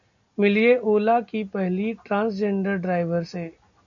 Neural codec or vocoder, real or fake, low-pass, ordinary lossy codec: none; real; 7.2 kHz; MP3, 96 kbps